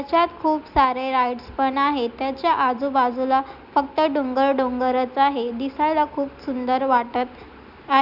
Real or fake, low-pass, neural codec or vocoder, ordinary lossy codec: real; 5.4 kHz; none; none